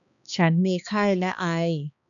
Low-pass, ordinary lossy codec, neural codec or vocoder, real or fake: 7.2 kHz; none; codec, 16 kHz, 2 kbps, X-Codec, HuBERT features, trained on balanced general audio; fake